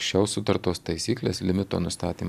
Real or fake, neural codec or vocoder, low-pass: real; none; 14.4 kHz